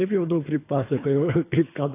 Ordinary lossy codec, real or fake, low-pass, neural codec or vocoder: AAC, 24 kbps; fake; 3.6 kHz; codec, 24 kHz, 3 kbps, HILCodec